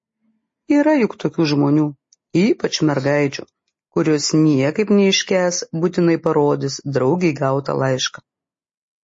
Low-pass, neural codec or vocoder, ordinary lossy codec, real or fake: 10.8 kHz; none; MP3, 32 kbps; real